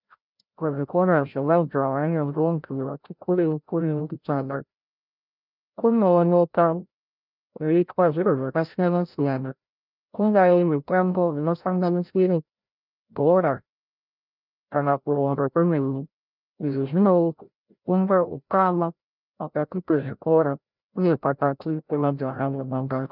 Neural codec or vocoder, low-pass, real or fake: codec, 16 kHz, 0.5 kbps, FreqCodec, larger model; 5.4 kHz; fake